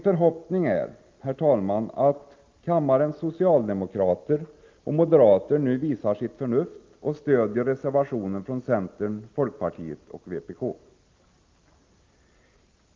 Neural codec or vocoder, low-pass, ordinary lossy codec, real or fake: none; 7.2 kHz; Opus, 24 kbps; real